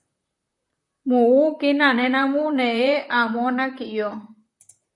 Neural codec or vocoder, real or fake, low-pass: vocoder, 44.1 kHz, 128 mel bands, Pupu-Vocoder; fake; 10.8 kHz